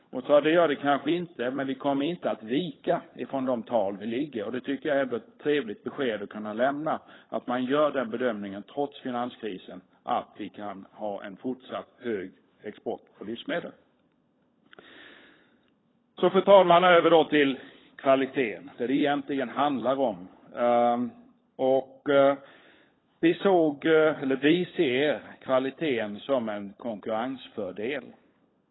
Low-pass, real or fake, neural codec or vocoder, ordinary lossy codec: 7.2 kHz; fake; codec, 16 kHz, 16 kbps, FunCodec, trained on LibriTTS, 50 frames a second; AAC, 16 kbps